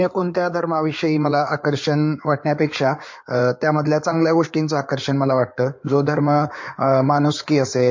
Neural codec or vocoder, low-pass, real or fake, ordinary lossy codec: codec, 16 kHz in and 24 kHz out, 2.2 kbps, FireRedTTS-2 codec; 7.2 kHz; fake; MP3, 48 kbps